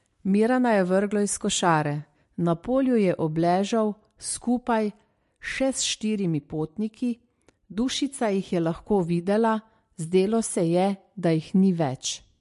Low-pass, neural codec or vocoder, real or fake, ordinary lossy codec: 14.4 kHz; none; real; MP3, 48 kbps